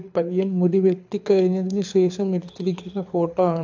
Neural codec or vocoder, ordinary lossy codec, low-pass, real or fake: codec, 24 kHz, 6 kbps, HILCodec; AAC, 48 kbps; 7.2 kHz; fake